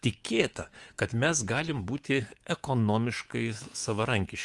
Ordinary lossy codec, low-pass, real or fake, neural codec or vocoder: Opus, 24 kbps; 10.8 kHz; fake; codec, 24 kHz, 3.1 kbps, DualCodec